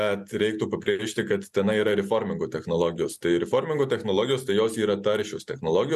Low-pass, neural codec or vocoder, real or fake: 14.4 kHz; none; real